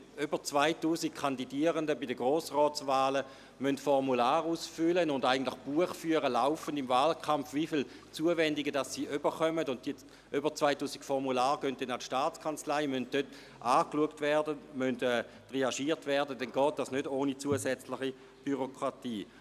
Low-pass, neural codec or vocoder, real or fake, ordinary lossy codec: 14.4 kHz; none; real; none